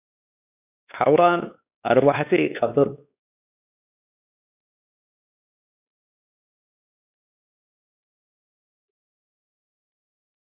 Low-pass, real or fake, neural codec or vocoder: 3.6 kHz; fake; codec, 16 kHz, 2 kbps, X-Codec, WavLM features, trained on Multilingual LibriSpeech